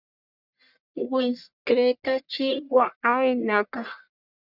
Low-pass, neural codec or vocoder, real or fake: 5.4 kHz; codec, 44.1 kHz, 1.7 kbps, Pupu-Codec; fake